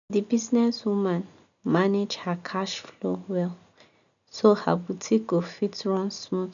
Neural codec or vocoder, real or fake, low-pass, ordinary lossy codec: none; real; 7.2 kHz; none